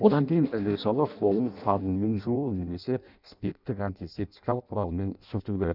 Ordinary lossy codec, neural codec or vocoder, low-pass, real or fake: none; codec, 16 kHz in and 24 kHz out, 0.6 kbps, FireRedTTS-2 codec; 5.4 kHz; fake